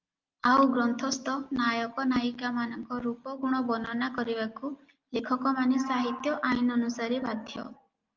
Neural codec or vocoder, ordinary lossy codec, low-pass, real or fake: none; Opus, 24 kbps; 7.2 kHz; real